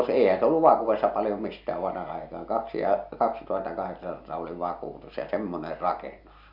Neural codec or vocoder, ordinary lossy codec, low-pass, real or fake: none; none; 5.4 kHz; real